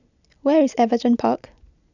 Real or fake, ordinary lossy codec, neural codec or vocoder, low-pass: real; none; none; 7.2 kHz